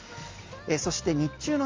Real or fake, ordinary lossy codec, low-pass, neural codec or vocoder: real; Opus, 32 kbps; 7.2 kHz; none